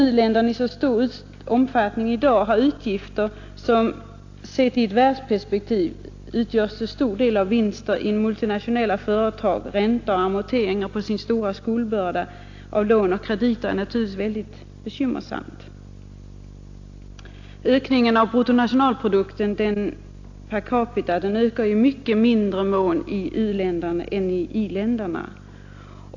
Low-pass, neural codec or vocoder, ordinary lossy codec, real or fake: 7.2 kHz; none; AAC, 48 kbps; real